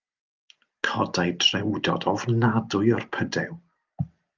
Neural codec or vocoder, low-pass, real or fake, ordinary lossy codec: none; 7.2 kHz; real; Opus, 24 kbps